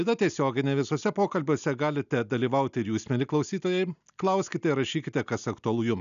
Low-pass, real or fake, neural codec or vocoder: 7.2 kHz; real; none